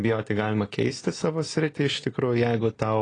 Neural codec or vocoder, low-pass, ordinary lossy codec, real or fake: none; 9.9 kHz; AAC, 32 kbps; real